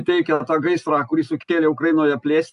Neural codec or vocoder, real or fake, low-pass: none; real; 10.8 kHz